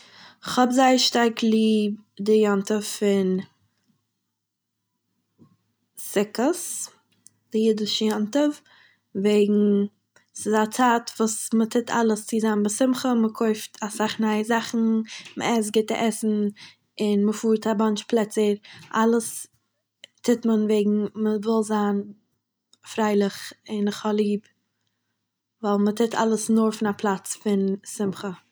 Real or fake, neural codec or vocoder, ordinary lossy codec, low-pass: real; none; none; none